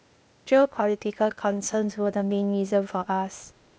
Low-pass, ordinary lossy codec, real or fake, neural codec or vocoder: none; none; fake; codec, 16 kHz, 0.8 kbps, ZipCodec